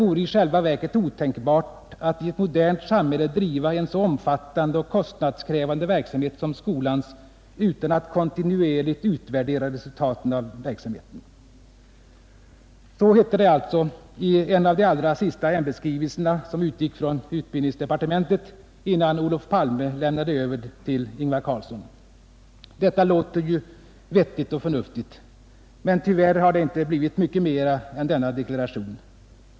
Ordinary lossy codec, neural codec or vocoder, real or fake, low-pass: none; none; real; none